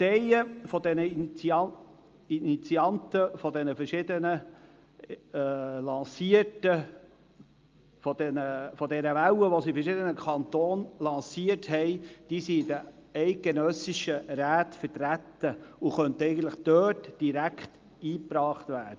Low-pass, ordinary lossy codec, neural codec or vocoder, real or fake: 7.2 kHz; Opus, 24 kbps; none; real